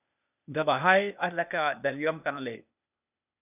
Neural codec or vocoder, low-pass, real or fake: codec, 16 kHz, 0.8 kbps, ZipCodec; 3.6 kHz; fake